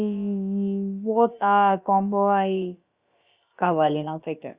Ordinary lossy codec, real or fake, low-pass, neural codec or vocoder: Opus, 64 kbps; fake; 3.6 kHz; codec, 16 kHz, about 1 kbps, DyCAST, with the encoder's durations